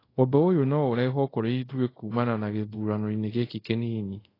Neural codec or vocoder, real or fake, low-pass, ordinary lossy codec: codec, 24 kHz, 0.5 kbps, DualCodec; fake; 5.4 kHz; AAC, 24 kbps